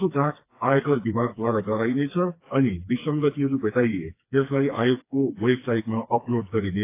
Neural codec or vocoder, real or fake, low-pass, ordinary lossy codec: codec, 16 kHz, 2 kbps, FreqCodec, smaller model; fake; 3.6 kHz; AAC, 24 kbps